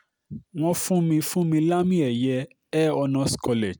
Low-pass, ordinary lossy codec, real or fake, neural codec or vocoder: none; none; real; none